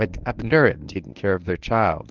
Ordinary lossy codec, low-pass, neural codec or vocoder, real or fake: Opus, 32 kbps; 7.2 kHz; codec, 24 kHz, 0.9 kbps, WavTokenizer, medium speech release version 1; fake